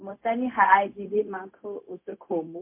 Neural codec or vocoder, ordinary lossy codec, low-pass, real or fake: codec, 16 kHz, 0.4 kbps, LongCat-Audio-Codec; MP3, 24 kbps; 3.6 kHz; fake